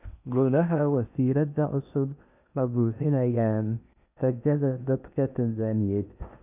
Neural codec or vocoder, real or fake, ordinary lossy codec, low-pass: codec, 16 kHz in and 24 kHz out, 0.8 kbps, FocalCodec, streaming, 65536 codes; fake; none; 3.6 kHz